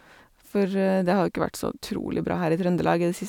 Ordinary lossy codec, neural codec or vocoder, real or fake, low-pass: none; none; real; 19.8 kHz